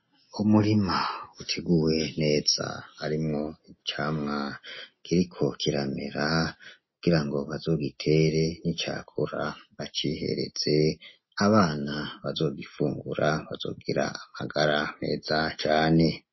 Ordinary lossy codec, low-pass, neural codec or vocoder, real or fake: MP3, 24 kbps; 7.2 kHz; none; real